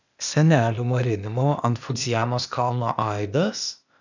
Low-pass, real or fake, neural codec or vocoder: 7.2 kHz; fake; codec, 16 kHz, 0.8 kbps, ZipCodec